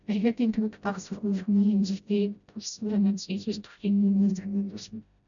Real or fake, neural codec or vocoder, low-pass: fake; codec, 16 kHz, 0.5 kbps, FreqCodec, smaller model; 7.2 kHz